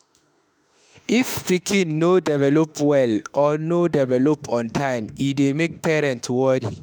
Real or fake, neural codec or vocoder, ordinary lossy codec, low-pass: fake; autoencoder, 48 kHz, 32 numbers a frame, DAC-VAE, trained on Japanese speech; none; none